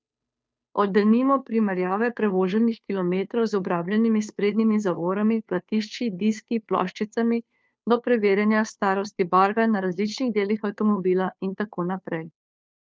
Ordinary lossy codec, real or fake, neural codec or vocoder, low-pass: none; fake; codec, 16 kHz, 2 kbps, FunCodec, trained on Chinese and English, 25 frames a second; none